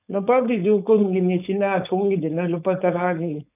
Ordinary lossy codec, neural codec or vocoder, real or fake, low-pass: none; codec, 16 kHz, 4.8 kbps, FACodec; fake; 3.6 kHz